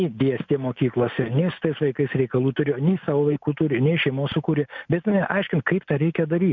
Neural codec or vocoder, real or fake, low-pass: none; real; 7.2 kHz